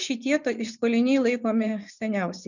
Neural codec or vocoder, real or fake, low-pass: vocoder, 24 kHz, 100 mel bands, Vocos; fake; 7.2 kHz